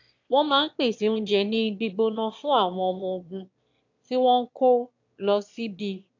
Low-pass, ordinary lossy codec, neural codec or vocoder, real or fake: 7.2 kHz; AAC, 48 kbps; autoencoder, 22.05 kHz, a latent of 192 numbers a frame, VITS, trained on one speaker; fake